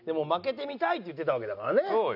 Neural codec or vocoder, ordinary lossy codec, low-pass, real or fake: none; none; 5.4 kHz; real